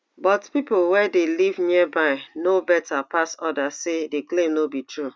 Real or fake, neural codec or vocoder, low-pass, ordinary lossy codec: real; none; none; none